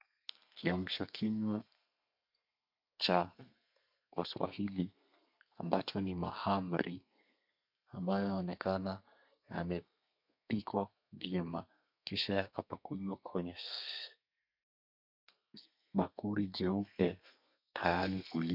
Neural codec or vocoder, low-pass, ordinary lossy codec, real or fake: codec, 32 kHz, 1.9 kbps, SNAC; 5.4 kHz; MP3, 48 kbps; fake